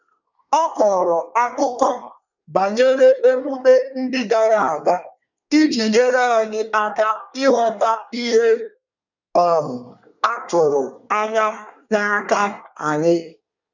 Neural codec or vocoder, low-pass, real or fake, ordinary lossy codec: codec, 24 kHz, 1 kbps, SNAC; 7.2 kHz; fake; none